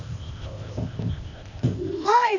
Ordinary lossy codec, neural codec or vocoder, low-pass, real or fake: none; codec, 16 kHz, 0.8 kbps, ZipCodec; 7.2 kHz; fake